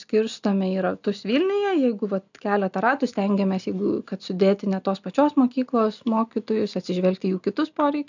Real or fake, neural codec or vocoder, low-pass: real; none; 7.2 kHz